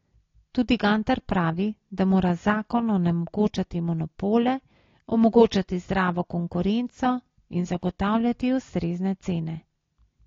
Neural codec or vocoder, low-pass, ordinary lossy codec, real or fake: none; 7.2 kHz; AAC, 32 kbps; real